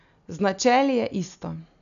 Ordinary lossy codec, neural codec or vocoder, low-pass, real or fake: none; none; 7.2 kHz; real